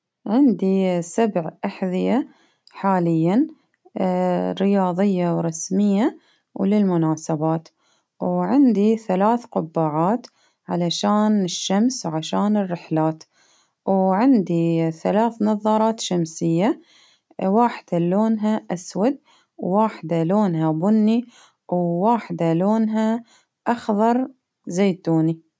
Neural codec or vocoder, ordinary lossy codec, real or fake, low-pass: none; none; real; none